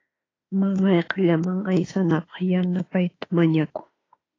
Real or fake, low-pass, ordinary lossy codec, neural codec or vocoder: fake; 7.2 kHz; AAC, 32 kbps; autoencoder, 48 kHz, 32 numbers a frame, DAC-VAE, trained on Japanese speech